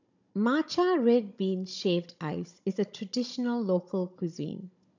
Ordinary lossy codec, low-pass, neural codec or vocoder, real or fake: none; 7.2 kHz; codec, 16 kHz, 16 kbps, FunCodec, trained on Chinese and English, 50 frames a second; fake